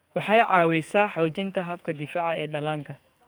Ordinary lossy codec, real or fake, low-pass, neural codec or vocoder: none; fake; none; codec, 44.1 kHz, 2.6 kbps, SNAC